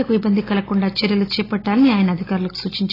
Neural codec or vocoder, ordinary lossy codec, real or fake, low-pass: none; AAC, 24 kbps; real; 5.4 kHz